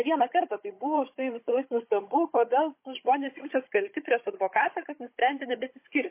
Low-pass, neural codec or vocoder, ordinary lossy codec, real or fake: 3.6 kHz; codec, 16 kHz, 16 kbps, FunCodec, trained on Chinese and English, 50 frames a second; MP3, 24 kbps; fake